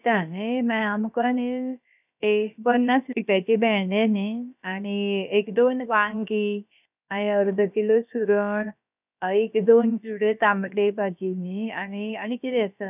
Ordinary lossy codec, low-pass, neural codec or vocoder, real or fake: none; 3.6 kHz; codec, 16 kHz, about 1 kbps, DyCAST, with the encoder's durations; fake